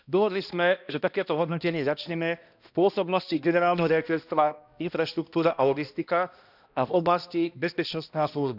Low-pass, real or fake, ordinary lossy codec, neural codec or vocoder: 5.4 kHz; fake; none; codec, 16 kHz, 1 kbps, X-Codec, HuBERT features, trained on balanced general audio